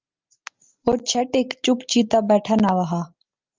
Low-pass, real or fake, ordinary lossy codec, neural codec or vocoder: 7.2 kHz; real; Opus, 32 kbps; none